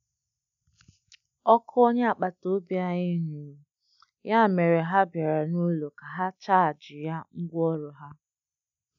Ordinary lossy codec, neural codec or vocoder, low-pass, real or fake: none; none; 7.2 kHz; real